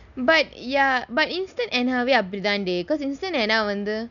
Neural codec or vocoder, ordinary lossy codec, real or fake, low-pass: none; none; real; 7.2 kHz